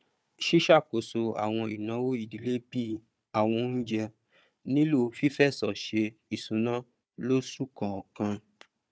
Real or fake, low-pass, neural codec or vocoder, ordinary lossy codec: fake; none; codec, 16 kHz, 4 kbps, FunCodec, trained on Chinese and English, 50 frames a second; none